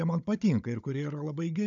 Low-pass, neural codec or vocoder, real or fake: 7.2 kHz; codec, 16 kHz, 16 kbps, FunCodec, trained on Chinese and English, 50 frames a second; fake